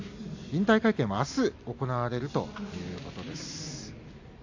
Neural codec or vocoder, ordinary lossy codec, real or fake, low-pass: none; AAC, 48 kbps; real; 7.2 kHz